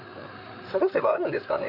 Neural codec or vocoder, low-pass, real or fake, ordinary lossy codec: codec, 16 kHz, 8 kbps, FreqCodec, larger model; 5.4 kHz; fake; none